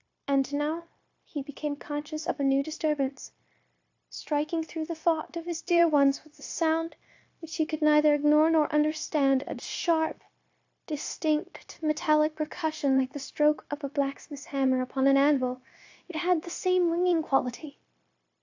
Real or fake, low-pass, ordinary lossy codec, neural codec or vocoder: fake; 7.2 kHz; AAC, 48 kbps; codec, 16 kHz, 0.9 kbps, LongCat-Audio-Codec